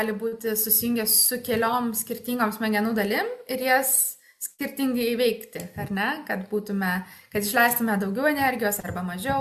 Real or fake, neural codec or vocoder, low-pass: real; none; 14.4 kHz